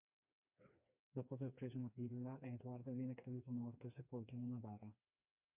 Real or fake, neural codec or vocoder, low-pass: fake; codec, 16 kHz, 2 kbps, FreqCodec, smaller model; 3.6 kHz